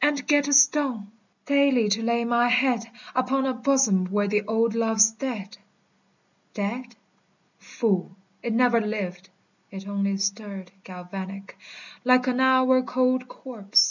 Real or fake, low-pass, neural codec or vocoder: real; 7.2 kHz; none